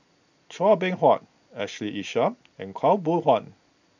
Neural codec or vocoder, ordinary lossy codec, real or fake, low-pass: none; none; real; 7.2 kHz